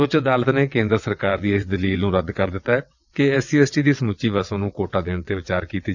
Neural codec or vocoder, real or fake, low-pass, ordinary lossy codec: vocoder, 22.05 kHz, 80 mel bands, WaveNeXt; fake; 7.2 kHz; none